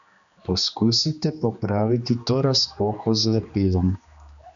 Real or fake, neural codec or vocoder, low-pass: fake; codec, 16 kHz, 2 kbps, X-Codec, HuBERT features, trained on general audio; 7.2 kHz